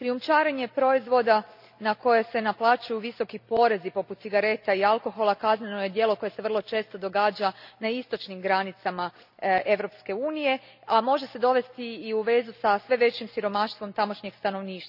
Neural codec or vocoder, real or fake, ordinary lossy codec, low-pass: none; real; none; 5.4 kHz